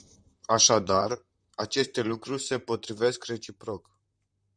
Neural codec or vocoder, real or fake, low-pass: vocoder, 44.1 kHz, 128 mel bands, Pupu-Vocoder; fake; 9.9 kHz